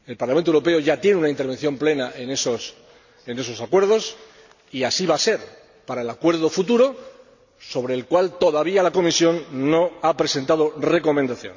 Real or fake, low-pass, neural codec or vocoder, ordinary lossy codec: real; 7.2 kHz; none; none